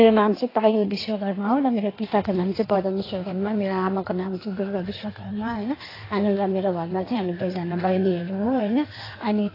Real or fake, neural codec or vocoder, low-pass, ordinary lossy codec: fake; codec, 16 kHz in and 24 kHz out, 1.1 kbps, FireRedTTS-2 codec; 5.4 kHz; AAC, 24 kbps